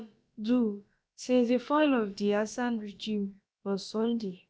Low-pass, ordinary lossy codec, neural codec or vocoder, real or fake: none; none; codec, 16 kHz, about 1 kbps, DyCAST, with the encoder's durations; fake